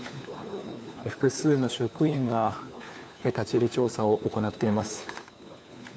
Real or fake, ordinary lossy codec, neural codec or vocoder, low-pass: fake; none; codec, 16 kHz, 4 kbps, FunCodec, trained on LibriTTS, 50 frames a second; none